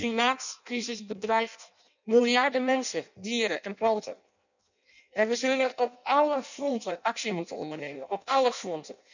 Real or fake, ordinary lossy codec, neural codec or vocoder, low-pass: fake; none; codec, 16 kHz in and 24 kHz out, 0.6 kbps, FireRedTTS-2 codec; 7.2 kHz